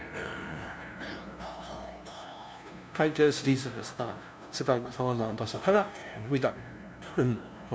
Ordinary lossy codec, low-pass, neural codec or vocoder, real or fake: none; none; codec, 16 kHz, 0.5 kbps, FunCodec, trained on LibriTTS, 25 frames a second; fake